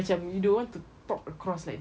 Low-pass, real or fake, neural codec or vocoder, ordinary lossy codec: none; real; none; none